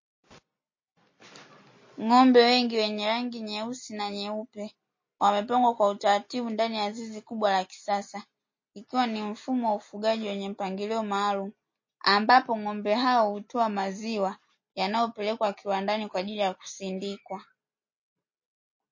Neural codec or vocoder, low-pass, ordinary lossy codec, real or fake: none; 7.2 kHz; MP3, 32 kbps; real